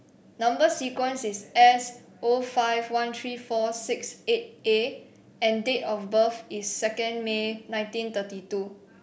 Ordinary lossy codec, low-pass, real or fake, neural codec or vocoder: none; none; real; none